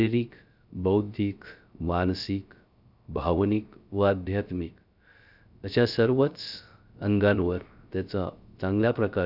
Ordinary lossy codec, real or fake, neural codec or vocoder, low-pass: none; fake; codec, 16 kHz, 0.3 kbps, FocalCodec; 5.4 kHz